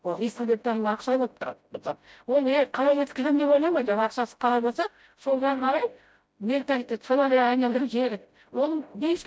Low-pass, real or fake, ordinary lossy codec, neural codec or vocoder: none; fake; none; codec, 16 kHz, 0.5 kbps, FreqCodec, smaller model